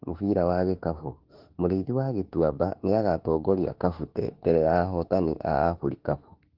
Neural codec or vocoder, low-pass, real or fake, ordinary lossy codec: autoencoder, 48 kHz, 32 numbers a frame, DAC-VAE, trained on Japanese speech; 5.4 kHz; fake; Opus, 16 kbps